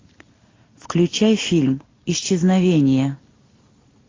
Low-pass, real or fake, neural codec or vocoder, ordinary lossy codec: 7.2 kHz; real; none; AAC, 32 kbps